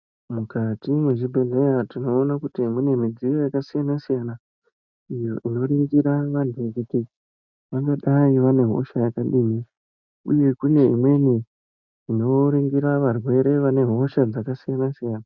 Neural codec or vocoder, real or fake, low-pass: none; real; 7.2 kHz